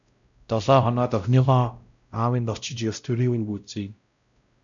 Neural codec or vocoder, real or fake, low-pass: codec, 16 kHz, 0.5 kbps, X-Codec, WavLM features, trained on Multilingual LibriSpeech; fake; 7.2 kHz